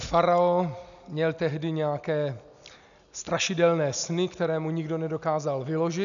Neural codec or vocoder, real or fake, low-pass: none; real; 7.2 kHz